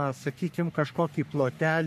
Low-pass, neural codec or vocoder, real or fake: 14.4 kHz; codec, 44.1 kHz, 3.4 kbps, Pupu-Codec; fake